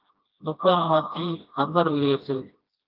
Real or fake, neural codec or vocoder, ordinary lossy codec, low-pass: fake; codec, 16 kHz, 1 kbps, FreqCodec, smaller model; Opus, 24 kbps; 5.4 kHz